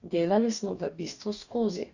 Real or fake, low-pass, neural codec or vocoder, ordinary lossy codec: fake; 7.2 kHz; codec, 44.1 kHz, 2.6 kbps, DAC; none